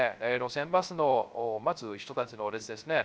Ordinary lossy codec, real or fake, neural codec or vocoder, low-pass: none; fake; codec, 16 kHz, 0.3 kbps, FocalCodec; none